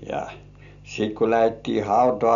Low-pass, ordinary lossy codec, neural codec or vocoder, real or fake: 7.2 kHz; none; none; real